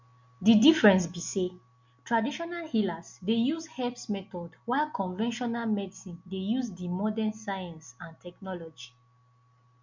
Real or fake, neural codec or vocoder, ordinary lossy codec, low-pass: real; none; MP3, 48 kbps; 7.2 kHz